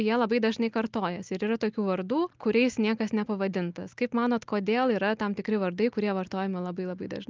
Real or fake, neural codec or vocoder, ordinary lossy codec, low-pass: real; none; Opus, 32 kbps; 7.2 kHz